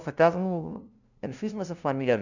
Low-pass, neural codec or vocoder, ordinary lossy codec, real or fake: 7.2 kHz; codec, 16 kHz, 0.5 kbps, FunCodec, trained on LibriTTS, 25 frames a second; none; fake